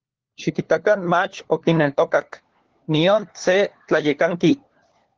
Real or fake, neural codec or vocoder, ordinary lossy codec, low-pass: fake; codec, 16 kHz, 4 kbps, FunCodec, trained on LibriTTS, 50 frames a second; Opus, 16 kbps; 7.2 kHz